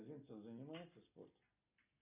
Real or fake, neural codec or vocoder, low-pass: real; none; 3.6 kHz